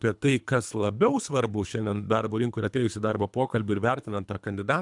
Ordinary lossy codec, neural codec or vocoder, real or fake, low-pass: MP3, 96 kbps; codec, 24 kHz, 3 kbps, HILCodec; fake; 10.8 kHz